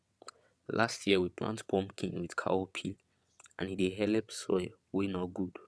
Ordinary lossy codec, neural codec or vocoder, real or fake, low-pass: none; vocoder, 22.05 kHz, 80 mel bands, WaveNeXt; fake; none